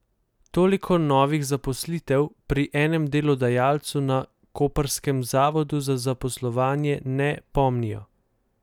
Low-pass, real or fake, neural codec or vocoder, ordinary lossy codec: 19.8 kHz; real; none; none